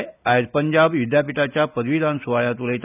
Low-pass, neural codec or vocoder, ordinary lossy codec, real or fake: 3.6 kHz; none; none; real